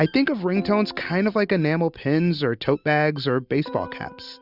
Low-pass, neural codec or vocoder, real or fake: 5.4 kHz; none; real